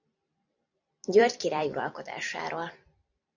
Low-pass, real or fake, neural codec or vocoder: 7.2 kHz; fake; vocoder, 44.1 kHz, 128 mel bands every 256 samples, BigVGAN v2